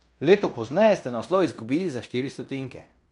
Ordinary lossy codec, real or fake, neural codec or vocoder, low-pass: none; fake; codec, 16 kHz in and 24 kHz out, 0.9 kbps, LongCat-Audio-Codec, fine tuned four codebook decoder; 10.8 kHz